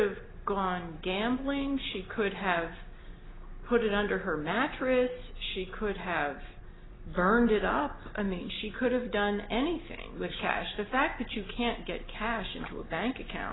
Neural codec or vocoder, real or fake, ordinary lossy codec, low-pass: none; real; AAC, 16 kbps; 7.2 kHz